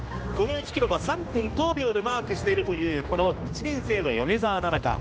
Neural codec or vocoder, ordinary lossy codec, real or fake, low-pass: codec, 16 kHz, 1 kbps, X-Codec, HuBERT features, trained on general audio; none; fake; none